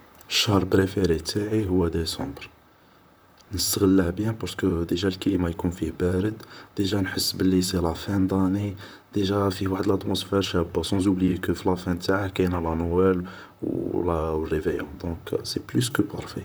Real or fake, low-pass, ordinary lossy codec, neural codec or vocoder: fake; none; none; vocoder, 44.1 kHz, 128 mel bands, Pupu-Vocoder